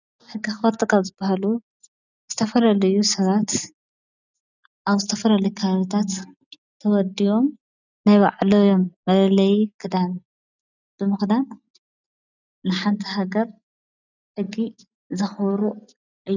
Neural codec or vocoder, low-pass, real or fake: none; 7.2 kHz; real